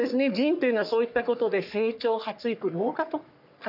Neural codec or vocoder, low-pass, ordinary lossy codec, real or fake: codec, 44.1 kHz, 3.4 kbps, Pupu-Codec; 5.4 kHz; none; fake